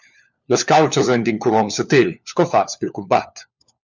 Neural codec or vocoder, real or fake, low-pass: codec, 16 kHz, 4 kbps, FunCodec, trained on LibriTTS, 50 frames a second; fake; 7.2 kHz